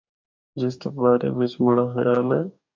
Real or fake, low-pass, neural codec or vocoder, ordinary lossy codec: fake; 7.2 kHz; codec, 44.1 kHz, 2.6 kbps, DAC; MP3, 48 kbps